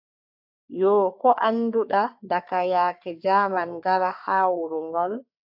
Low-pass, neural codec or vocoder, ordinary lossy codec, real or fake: 5.4 kHz; codec, 44.1 kHz, 3.4 kbps, Pupu-Codec; AAC, 48 kbps; fake